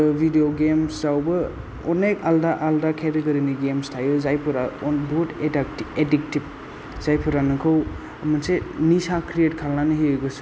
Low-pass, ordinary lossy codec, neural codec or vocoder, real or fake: none; none; none; real